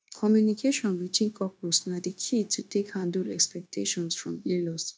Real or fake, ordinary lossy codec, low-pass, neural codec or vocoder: fake; none; none; codec, 16 kHz, 0.9 kbps, LongCat-Audio-Codec